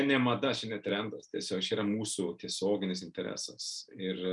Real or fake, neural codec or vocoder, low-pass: real; none; 10.8 kHz